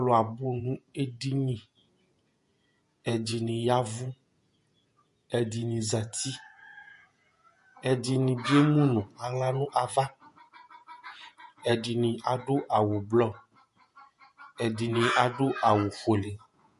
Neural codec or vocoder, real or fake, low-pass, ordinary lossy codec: vocoder, 48 kHz, 128 mel bands, Vocos; fake; 14.4 kHz; MP3, 48 kbps